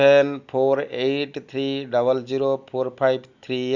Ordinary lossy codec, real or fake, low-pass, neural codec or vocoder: Opus, 64 kbps; real; 7.2 kHz; none